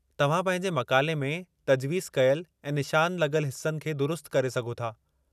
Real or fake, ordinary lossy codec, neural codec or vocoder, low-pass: real; none; none; 14.4 kHz